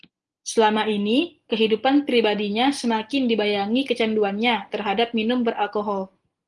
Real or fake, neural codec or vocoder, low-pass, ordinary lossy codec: real; none; 10.8 kHz; Opus, 24 kbps